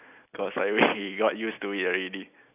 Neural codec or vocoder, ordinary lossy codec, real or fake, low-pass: none; none; real; 3.6 kHz